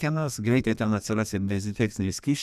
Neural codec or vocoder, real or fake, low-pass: codec, 32 kHz, 1.9 kbps, SNAC; fake; 14.4 kHz